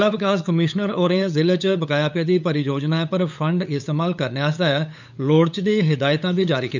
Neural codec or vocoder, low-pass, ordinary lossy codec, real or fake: codec, 16 kHz, 8 kbps, FunCodec, trained on LibriTTS, 25 frames a second; 7.2 kHz; none; fake